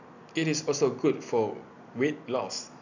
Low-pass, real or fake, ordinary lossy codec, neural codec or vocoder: 7.2 kHz; real; none; none